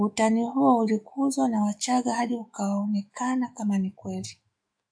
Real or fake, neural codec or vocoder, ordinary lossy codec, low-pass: fake; autoencoder, 48 kHz, 128 numbers a frame, DAC-VAE, trained on Japanese speech; AAC, 64 kbps; 9.9 kHz